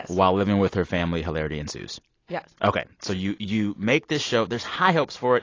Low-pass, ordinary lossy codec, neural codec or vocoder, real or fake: 7.2 kHz; AAC, 32 kbps; none; real